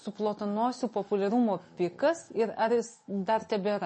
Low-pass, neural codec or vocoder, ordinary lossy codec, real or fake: 10.8 kHz; none; MP3, 32 kbps; real